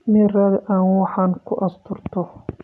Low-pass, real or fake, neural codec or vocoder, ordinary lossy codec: none; real; none; none